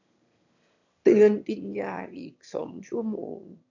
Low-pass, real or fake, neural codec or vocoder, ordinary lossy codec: 7.2 kHz; fake; autoencoder, 22.05 kHz, a latent of 192 numbers a frame, VITS, trained on one speaker; none